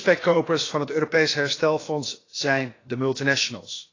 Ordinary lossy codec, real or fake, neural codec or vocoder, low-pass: AAC, 32 kbps; fake; codec, 16 kHz, about 1 kbps, DyCAST, with the encoder's durations; 7.2 kHz